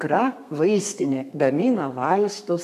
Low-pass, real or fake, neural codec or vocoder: 14.4 kHz; fake; codec, 32 kHz, 1.9 kbps, SNAC